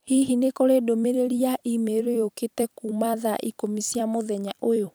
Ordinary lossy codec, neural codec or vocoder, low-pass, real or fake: none; vocoder, 44.1 kHz, 128 mel bands every 256 samples, BigVGAN v2; none; fake